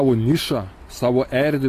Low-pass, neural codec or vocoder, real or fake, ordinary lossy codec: 14.4 kHz; none; real; AAC, 48 kbps